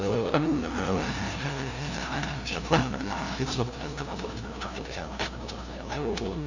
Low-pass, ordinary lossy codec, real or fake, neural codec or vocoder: 7.2 kHz; none; fake; codec, 16 kHz, 0.5 kbps, FunCodec, trained on LibriTTS, 25 frames a second